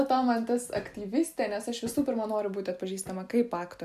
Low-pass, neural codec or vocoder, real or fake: 14.4 kHz; none; real